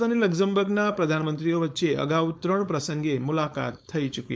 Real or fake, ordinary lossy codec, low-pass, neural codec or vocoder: fake; none; none; codec, 16 kHz, 4.8 kbps, FACodec